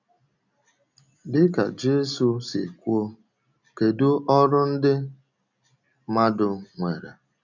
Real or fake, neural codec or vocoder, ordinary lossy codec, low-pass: real; none; none; 7.2 kHz